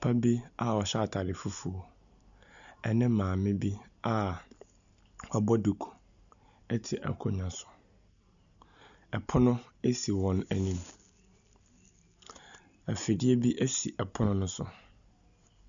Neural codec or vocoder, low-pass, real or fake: none; 7.2 kHz; real